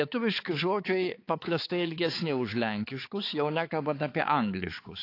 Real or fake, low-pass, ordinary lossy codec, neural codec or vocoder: fake; 5.4 kHz; AAC, 32 kbps; codec, 16 kHz, 4 kbps, X-Codec, HuBERT features, trained on balanced general audio